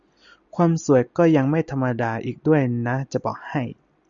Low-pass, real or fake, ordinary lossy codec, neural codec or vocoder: 7.2 kHz; real; Opus, 64 kbps; none